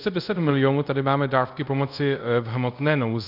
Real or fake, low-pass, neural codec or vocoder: fake; 5.4 kHz; codec, 24 kHz, 0.5 kbps, DualCodec